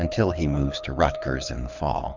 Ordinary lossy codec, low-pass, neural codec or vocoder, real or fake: Opus, 32 kbps; 7.2 kHz; codec, 24 kHz, 6 kbps, HILCodec; fake